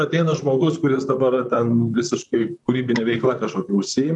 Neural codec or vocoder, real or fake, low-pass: vocoder, 44.1 kHz, 128 mel bands, Pupu-Vocoder; fake; 10.8 kHz